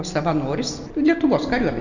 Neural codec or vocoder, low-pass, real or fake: none; 7.2 kHz; real